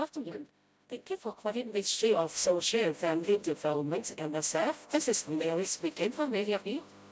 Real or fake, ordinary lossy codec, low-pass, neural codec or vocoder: fake; none; none; codec, 16 kHz, 0.5 kbps, FreqCodec, smaller model